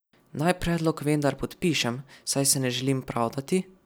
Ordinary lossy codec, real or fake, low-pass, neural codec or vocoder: none; real; none; none